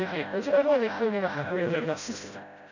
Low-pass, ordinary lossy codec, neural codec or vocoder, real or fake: 7.2 kHz; none; codec, 16 kHz, 0.5 kbps, FreqCodec, smaller model; fake